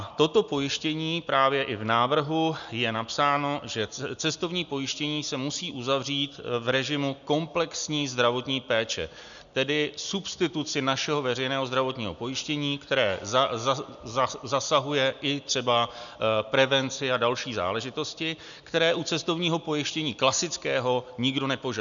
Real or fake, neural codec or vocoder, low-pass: real; none; 7.2 kHz